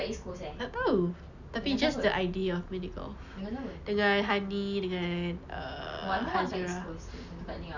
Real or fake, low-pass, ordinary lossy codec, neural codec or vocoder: real; 7.2 kHz; none; none